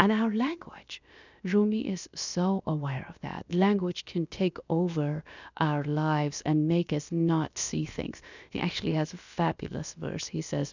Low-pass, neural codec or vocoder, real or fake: 7.2 kHz; codec, 16 kHz, about 1 kbps, DyCAST, with the encoder's durations; fake